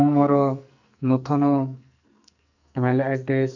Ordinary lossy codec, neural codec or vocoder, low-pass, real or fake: none; codec, 44.1 kHz, 2.6 kbps, SNAC; 7.2 kHz; fake